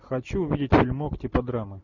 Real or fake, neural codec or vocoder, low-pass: real; none; 7.2 kHz